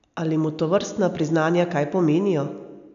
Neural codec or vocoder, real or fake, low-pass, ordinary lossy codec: none; real; 7.2 kHz; none